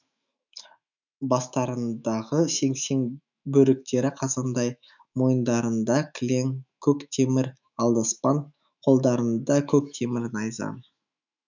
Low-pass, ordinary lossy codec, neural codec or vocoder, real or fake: 7.2 kHz; none; autoencoder, 48 kHz, 128 numbers a frame, DAC-VAE, trained on Japanese speech; fake